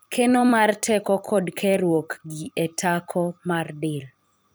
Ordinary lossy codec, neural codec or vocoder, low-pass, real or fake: none; vocoder, 44.1 kHz, 128 mel bands every 256 samples, BigVGAN v2; none; fake